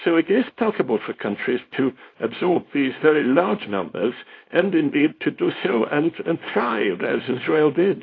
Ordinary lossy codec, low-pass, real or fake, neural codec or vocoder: AAC, 32 kbps; 7.2 kHz; fake; codec, 24 kHz, 0.9 kbps, WavTokenizer, small release